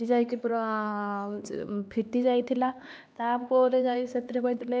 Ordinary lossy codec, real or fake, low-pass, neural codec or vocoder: none; fake; none; codec, 16 kHz, 2 kbps, X-Codec, HuBERT features, trained on LibriSpeech